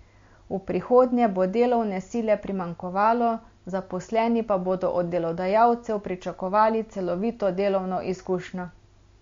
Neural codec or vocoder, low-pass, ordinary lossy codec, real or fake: none; 7.2 kHz; MP3, 48 kbps; real